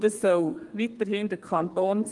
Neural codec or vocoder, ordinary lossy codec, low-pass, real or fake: codec, 32 kHz, 1.9 kbps, SNAC; Opus, 24 kbps; 10.8 kHz; fake